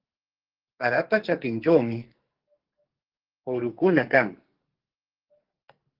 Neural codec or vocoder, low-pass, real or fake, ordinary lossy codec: codec, 44.1 kHz, 2.6 kbps, SNAC; 5.4 kHz; fake; Opus, 16 kbps